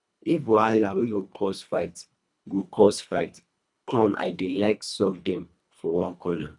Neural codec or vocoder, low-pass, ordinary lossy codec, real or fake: codec, 24 kHz, 1.5 kbps, HILCodec; 10.8 kHz; none; fake